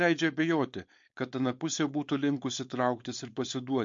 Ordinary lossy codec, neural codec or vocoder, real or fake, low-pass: MP3, 48 kbps; codec, 16 kHz, 4.8 kbps, FACodec; fake; 7.2 kHz